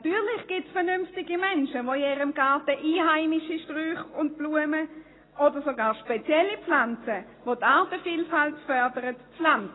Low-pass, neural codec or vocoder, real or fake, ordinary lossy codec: 7.2 kHz; none; real; AAC, 16 kbps